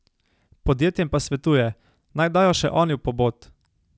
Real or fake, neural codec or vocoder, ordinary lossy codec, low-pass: real; none; none; none